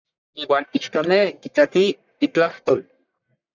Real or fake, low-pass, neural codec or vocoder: fake; 7.2 kHz; codec, 44.1 kHz, 1.7 kbps, Pupu-Codec